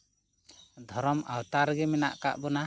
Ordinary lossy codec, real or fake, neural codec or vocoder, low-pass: none; real; none; none